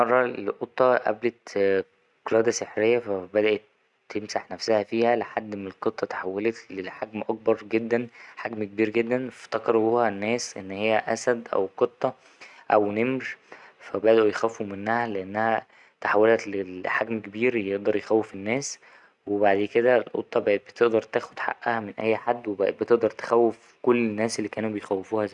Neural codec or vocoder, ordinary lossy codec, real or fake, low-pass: none; none; real; 10.8 kHz